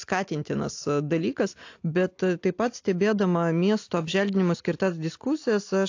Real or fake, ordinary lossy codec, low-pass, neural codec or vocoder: real; AAC, 48 kbps; 7.2 kHz; none